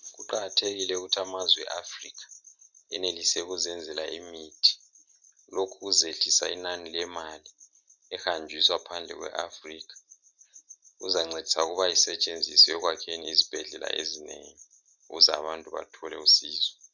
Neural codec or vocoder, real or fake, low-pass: none; real; 7.2 kHz